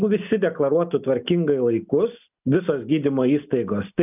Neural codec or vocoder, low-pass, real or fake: none; 3.6 kHz; real